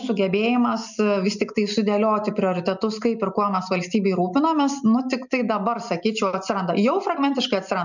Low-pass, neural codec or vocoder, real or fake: 7.2 kHz; none; real